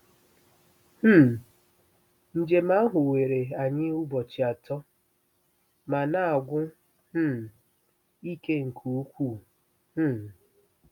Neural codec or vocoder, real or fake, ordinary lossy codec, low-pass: none; real; none; 19.8 kHz